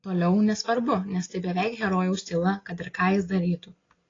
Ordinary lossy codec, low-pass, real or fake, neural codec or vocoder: AAC, 32 kbps; 7.2 kHz; real; none